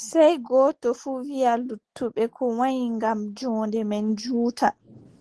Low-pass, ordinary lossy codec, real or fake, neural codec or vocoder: 10.8 kHz; Opus, 16 kbps; real; none